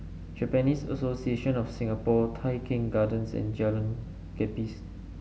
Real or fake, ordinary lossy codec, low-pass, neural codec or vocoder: real; none; none; none